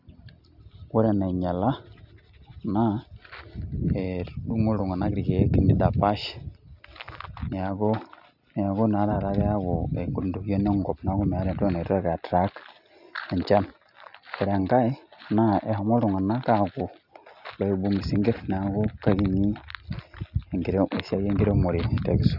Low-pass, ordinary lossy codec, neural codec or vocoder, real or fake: 5.4 kHz; none; none; real